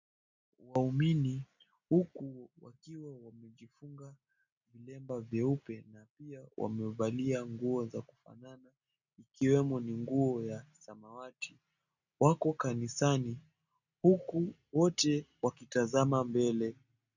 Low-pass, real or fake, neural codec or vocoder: 7.2 kHz; real; none